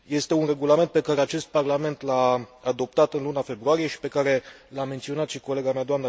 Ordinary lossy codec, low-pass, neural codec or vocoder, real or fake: none; none; none; real